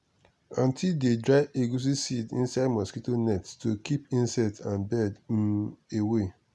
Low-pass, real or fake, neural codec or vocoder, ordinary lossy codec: none; real; none; none